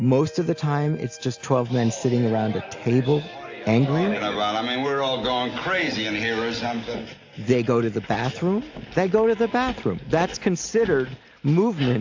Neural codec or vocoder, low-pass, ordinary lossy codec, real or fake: none; 7.2 kHz; AAC, 48 kbps; real